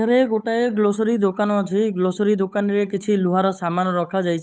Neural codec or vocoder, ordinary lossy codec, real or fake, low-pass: codec, 16 kHz, 8 kbps, FunCodec, trained on Chinese and English, 25 frames a second; none; fake; none